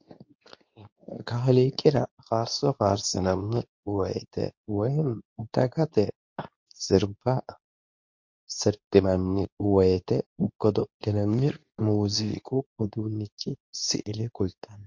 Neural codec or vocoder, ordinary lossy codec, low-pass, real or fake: codec, 24 kHz, 0.9 kbps, WavTokenizer, medium speech release version 2; MP3, 48 kbps; 7.2 kHz; fake